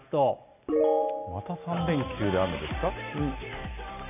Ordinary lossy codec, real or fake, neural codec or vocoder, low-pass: none; real; none; 3.6 kHz